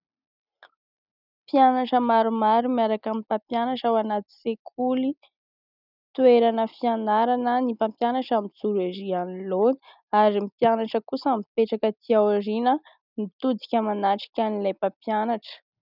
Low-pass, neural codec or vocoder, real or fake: 5.4 kHz; none; real